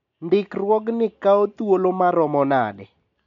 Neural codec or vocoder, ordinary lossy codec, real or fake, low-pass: none; none; real; 7.2 kHz